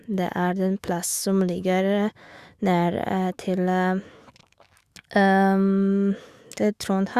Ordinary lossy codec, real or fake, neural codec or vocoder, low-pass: Opus, 64 kbps; fake; autoencoder, 48 kHz, 128 numbers a frame, DAC-VAE, trained on Japanese speech; 14.4 kHz